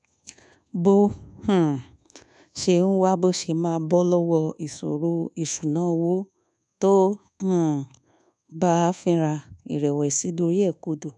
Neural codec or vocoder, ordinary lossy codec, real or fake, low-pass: codec, 24 kHz, 1.2 kbps, DualCodec; none; fake; none